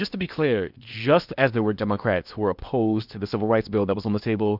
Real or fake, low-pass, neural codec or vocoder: fake; 5.4 kHz; codec, 16 kHz in and 24 kHz out, 0.8 kbps, FocalCodec, streaming, 65536 codes